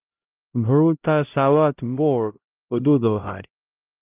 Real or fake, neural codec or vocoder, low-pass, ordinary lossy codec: fake; codec, 16 kHz, 0.5 kbps, X-Codec, HuBERT features, trained on LibriSpeech; 3.6 kHz; Opus, 32 kbps